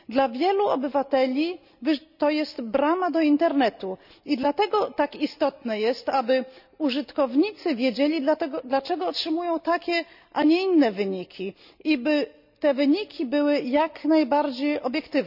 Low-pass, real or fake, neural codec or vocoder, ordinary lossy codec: 5.4 kHz; real; none; none